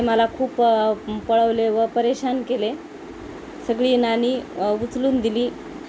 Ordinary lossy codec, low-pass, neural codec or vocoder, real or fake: none; none; none; real